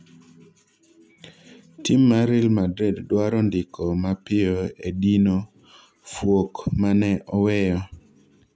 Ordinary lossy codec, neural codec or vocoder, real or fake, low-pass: none; none; real; none